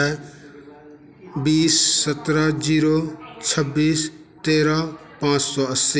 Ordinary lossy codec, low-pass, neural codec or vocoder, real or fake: none; none; none; real